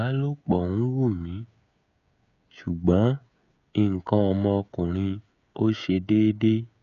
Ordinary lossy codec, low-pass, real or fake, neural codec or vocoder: none; 7.2 kHz; fake; codec, 16 kHz, 16 kbps, FreqCodec, smaller model